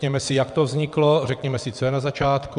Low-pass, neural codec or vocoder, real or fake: 9.9 kHz; vocoder, 22.05 kHz, 80 mel bands, WaveNeXt; fake